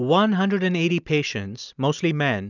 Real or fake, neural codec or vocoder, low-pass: real; none; 7.2 kHz